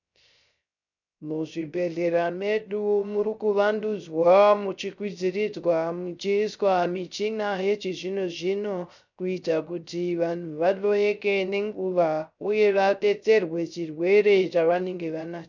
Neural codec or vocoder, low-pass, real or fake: codec, 16 kHz, 0.3 kbps, FocalCodec; 7.2 kHz; fake